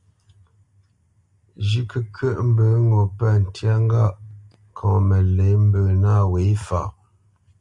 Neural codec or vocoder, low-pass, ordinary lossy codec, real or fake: none; 10.8 kHz; Opus, 64 kbps; real